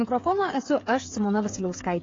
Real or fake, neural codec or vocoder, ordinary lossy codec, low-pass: fake; codec, 16 kHz, 8 kbps, FreqCodec, smaller model; AAC, 32 kbps; 7.2 kHz